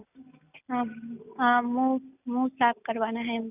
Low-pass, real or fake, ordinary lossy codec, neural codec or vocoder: 3.6 kHz; real; none; none